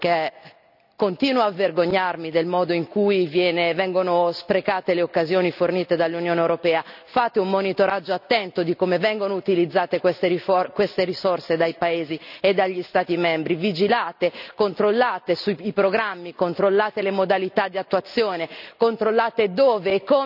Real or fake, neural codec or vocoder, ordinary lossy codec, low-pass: real; none; none; 5.4 kHz